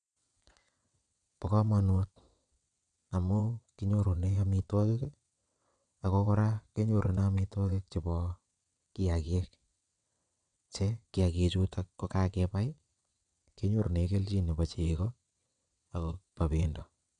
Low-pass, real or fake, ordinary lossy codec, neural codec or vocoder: 9.9 kHz; fake; MP3, 96 kbps; vocoder, 22.05 kHz, 80 mel bands, WaveNeXt